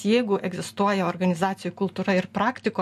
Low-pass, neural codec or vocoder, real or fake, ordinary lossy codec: 14.4 kHz; none; real; MP3, 64 kbps